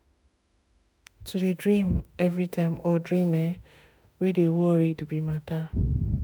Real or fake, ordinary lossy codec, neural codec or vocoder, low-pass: fake; none; autoencoder, 48 kHz, 32 numbers a frame, DAC-VAE, trained on Japanese speech; none